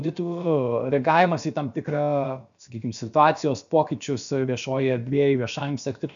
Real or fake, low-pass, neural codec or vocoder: fake; 7.2 kHz; codec, 16 kHz, about 1 kbps, DyCAST, with the encoder's durations